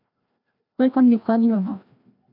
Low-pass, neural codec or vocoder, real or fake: 5.4 kHz; codec, 16 kHz, 1 kbps, FreqCodec, larger model; fake